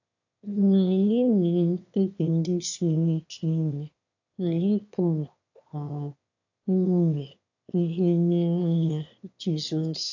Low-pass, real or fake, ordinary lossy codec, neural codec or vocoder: 7.2 kHz; fake; AAC, 48 kbps; autoencoder, 22.05 kHz, a latent of 192 numbers a frame, VITS, trained on one speaker